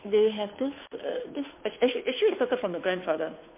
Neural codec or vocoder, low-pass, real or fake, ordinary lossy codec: codec, 16 kHz, 2 kbps, FunCodec, trained on Chinese and English, 25 frames a second; 3.6 kHz; fake; MP3, 24 kbps